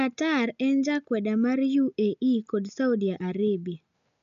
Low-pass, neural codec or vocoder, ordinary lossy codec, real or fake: 7.2 kHz; none; none; real